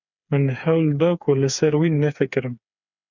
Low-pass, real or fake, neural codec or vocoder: 7.2 kHz; fake; codec, 16 kHz, 4 kbps, FreqCodec, smaller model